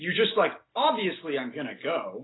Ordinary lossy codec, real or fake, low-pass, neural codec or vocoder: AAC, 16 kbps; real; 7.2 kHz; none